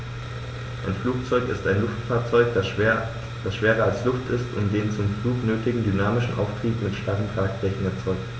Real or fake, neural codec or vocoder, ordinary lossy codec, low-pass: real; none; none; none